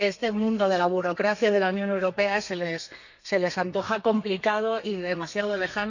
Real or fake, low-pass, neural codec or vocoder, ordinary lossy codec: fake; 7.2 kHz; codec, 32 kHz, 1.9 kbps, SNAC; none